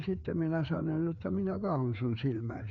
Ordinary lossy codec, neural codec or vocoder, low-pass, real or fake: MP3, 96 kbps; codec, 16 kHz, 4 kbps, FreqCodec, larger model; 7.2 kHz; fake